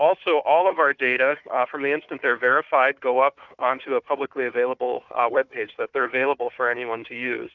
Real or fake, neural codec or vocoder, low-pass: fake; codec, 16 kHz, 4 kbps, FunCodec, trained on Chinese and English, 50 frames a second; 7.2 kHz